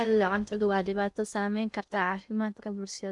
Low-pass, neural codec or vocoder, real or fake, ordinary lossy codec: 10.8 kHz; codec, 16 kHz in and 24 kHz out, 0.6 kbps, FocalCodec, streaming, 2048 codes; fake; none